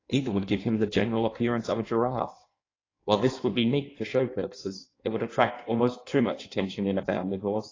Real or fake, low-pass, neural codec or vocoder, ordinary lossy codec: fake; 7.2 kHz; codec, 16 kHz in and 24 kHz out, 1.1 kbps, FireRedTTS-2 codec; AAC, 32 kbps